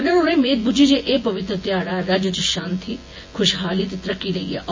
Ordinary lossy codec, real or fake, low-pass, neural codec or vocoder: MP3, 32 kbps; fake; 7.2 kHz; vocoder, 24 kHz, 100 mel bands, Vocos